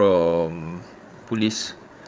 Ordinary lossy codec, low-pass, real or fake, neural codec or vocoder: none; none; fake; codec, 16 kHz, 8 kbps, FreqCodec, larger model